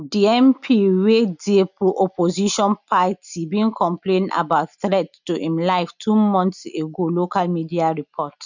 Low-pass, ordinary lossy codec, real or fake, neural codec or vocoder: 7.2 kHz; none; real; none